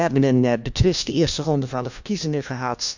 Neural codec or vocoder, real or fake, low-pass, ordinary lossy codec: codec, 16 kHz, 1 kbps, FunCodec, trained on LibriTTS, 50 frames a second; fake; 7.2 kHz; none